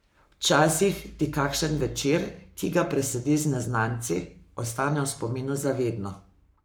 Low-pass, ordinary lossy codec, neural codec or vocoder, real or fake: none; none; codec, 44.1 kHz, 7.8 kbps, Pupu-Codec; fake